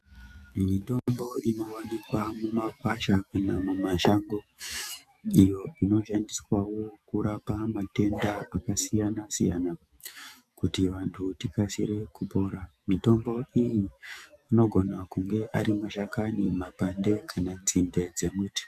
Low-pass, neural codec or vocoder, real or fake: 14.4 kHz; autoencoder, 48 kHz, 128 numbers a frame, DAC-VAE, trained on Japanese speech; fake